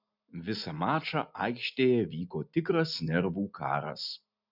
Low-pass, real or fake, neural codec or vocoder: 5.4 kHz; real; none